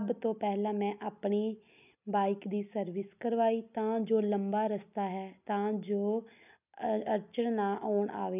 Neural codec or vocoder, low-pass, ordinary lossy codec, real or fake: none; 3.6 kHz; none; real